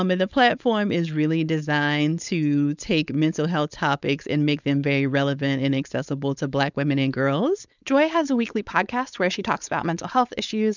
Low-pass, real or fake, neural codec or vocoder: 7.2 kHz; fake; codec, 16 kHz, 4.8 kbps, FACodec